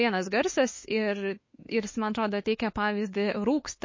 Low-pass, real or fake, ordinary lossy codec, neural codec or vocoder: 7.2 kHz; fake; MP3, 32 kbps; autoencoder, 48 kHz, 32 numbers a frame, DAC-VAE, trained on Japanese speech